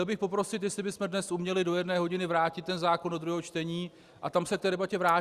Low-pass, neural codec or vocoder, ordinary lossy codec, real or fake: 14.4 kHz; none; Opus, 64 kbps; real